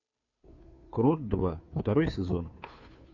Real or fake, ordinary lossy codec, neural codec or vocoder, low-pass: fake; AAC, 48 kbps; codec, 16 kHz, 2 kbps, FunCodec, trained on Chinese and English, 25 frames a second; 7.2 kHz